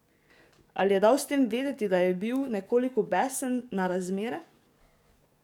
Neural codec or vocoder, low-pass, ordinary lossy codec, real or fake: codec, 44.1 kHz, 7.8 kbps, DAC; 19.8 kHz; none; fake